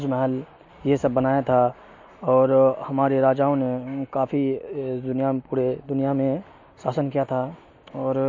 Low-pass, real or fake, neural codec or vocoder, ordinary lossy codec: 7.2 kHz; real; none; MP3, 32 kbps